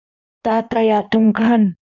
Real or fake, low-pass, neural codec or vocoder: fake; 7.2 kHz; codec, 16 kHz in and 24 kHz out, 1.1 kbps, FireRedTTS-2 codec